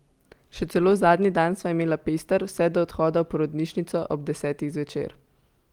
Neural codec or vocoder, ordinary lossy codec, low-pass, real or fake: none; Opus, 24 kbps; 19.8 kHz; real